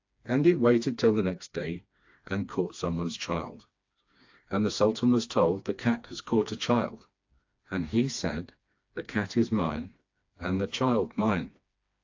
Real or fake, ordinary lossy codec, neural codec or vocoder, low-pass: fake; AAC, 48 kbps; codec, 16 kHz, 2 kbps, FreqCodec, smaller model; 7.2 kHz